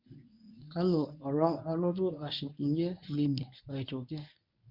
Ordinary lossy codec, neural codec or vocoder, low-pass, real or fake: none; codec, 24 kHz, 0.9 kbps, WavTokenizer, medium speech release version 1; 5.4 kHz; fake